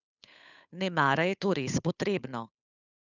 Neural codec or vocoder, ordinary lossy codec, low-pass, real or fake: codec, 16 kHz, 8 kbps, FunCodec, trained on Chinese and English, 25 frames a second; none; 7.2 kHz; fake